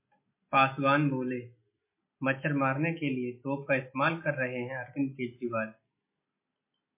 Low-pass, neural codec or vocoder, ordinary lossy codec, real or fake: 3.6 kHz; none; MP3, 24 kbps; real